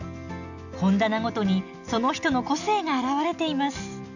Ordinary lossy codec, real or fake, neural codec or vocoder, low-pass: none; real; none; 7.2 kHz